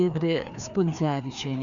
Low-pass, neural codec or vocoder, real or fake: 7.2 kHz; codec, 16 kHz, 2 kbps, FreqCodec, larger model; fake